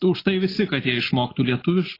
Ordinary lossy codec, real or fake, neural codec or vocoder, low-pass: AAC, 24 kbps; real; none; 5.4 kHz